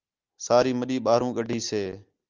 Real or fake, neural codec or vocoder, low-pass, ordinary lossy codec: real; none; 7.2 kHz; Opus, 32 kbps